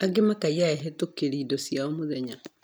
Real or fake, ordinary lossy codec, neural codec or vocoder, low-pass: real; none; none; none